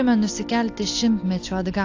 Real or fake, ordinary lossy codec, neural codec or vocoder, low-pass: real; AAC, 48 kbps; none; 7.2 kHz